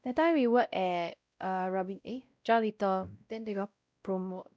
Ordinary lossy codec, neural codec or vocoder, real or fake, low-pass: none; codec, 16 kHz, 0.5 kbps, X-Codec, WavLM features, trained on Multilingual LibriSpeech; fake; none